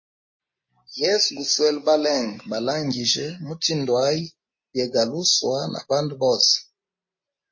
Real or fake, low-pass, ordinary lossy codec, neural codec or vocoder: real; 7.2 kHz; MP3, 32 kbps; none